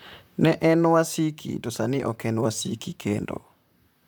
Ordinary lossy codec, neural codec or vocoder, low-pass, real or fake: none; vocoder, 44.1 kHz, 128 mel bands, Pupu-Vocoder; none; fake